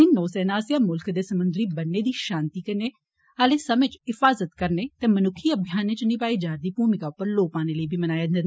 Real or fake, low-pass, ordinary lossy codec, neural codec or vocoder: real; none; none; none